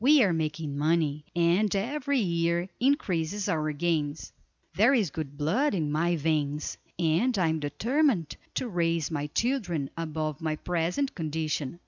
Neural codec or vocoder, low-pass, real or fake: none; 7.2 kHz; real